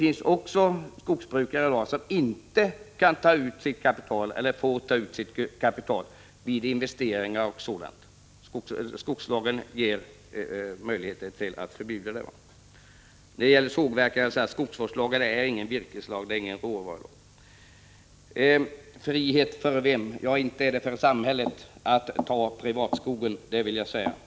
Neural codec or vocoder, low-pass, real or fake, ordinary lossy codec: none; none; real; none